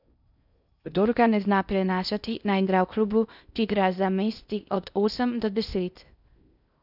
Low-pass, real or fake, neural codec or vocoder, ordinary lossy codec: 5.4 kHz; fake; codec, 16 kHz in and 24 kHz out, 0.6 kbps, FocalCodec, streaming, 2048 codes; none